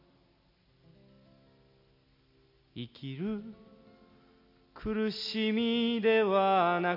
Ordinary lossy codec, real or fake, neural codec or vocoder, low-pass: none; real; none; 5.4 kHz